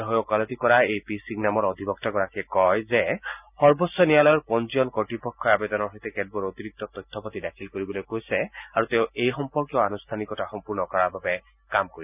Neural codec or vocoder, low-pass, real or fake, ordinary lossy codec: none; 3.6 kHz; real; none